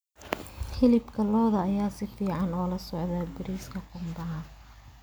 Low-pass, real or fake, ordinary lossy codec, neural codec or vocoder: none; real; none; none